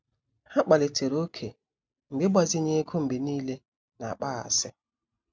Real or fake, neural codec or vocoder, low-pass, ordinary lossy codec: real; none; none; none